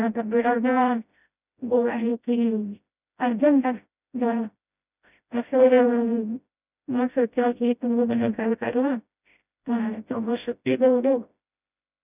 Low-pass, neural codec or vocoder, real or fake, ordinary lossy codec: 3.6 kHz; codec, 16 kHz, 0.5 kbps, FreqCodec, smaller model; fake; none